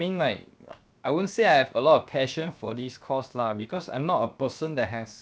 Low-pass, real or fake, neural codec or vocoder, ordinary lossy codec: none; fake; codec, 16 kHz, 0.7 kbps, FocalCodec; none